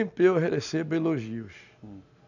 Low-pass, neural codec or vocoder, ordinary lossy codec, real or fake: 7.2 kHz; none; none; real